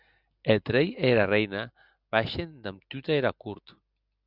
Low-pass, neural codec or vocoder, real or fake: 5.4 kHz; none; real